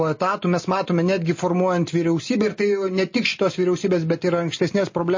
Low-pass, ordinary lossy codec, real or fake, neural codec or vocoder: 7.2 kHz; MP3, 32 kbps; real; none